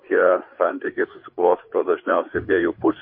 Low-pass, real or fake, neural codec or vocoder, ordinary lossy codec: 5.4 kHz; fake; codec, 16 kHz, 4 kbps, FreqCodec, larger model; MP3, 32 kbps